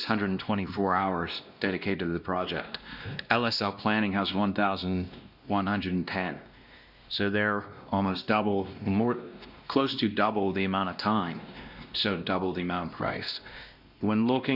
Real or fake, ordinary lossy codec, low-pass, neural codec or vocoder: fake; Opus, 64 kbps; 5.4 kHz; codec, 16 kHz, 1 kbps, X-Codec, WavLM features, trained on Multilingual LibriSpeech